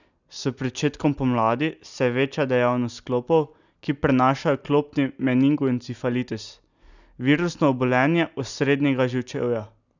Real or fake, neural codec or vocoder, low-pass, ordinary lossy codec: real; none; 7.2 kHz; none